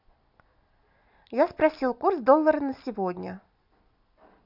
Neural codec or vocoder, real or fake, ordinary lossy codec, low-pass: none; real; none; 5.4 kHz